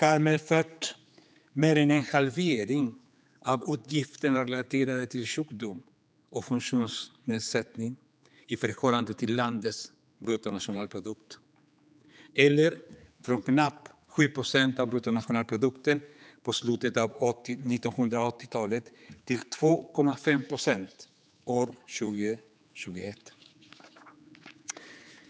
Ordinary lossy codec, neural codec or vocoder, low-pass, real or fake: none; codec, 16 kHz, 4 kbps, X-Codec, HuBERT features, trained on general audio; none; fake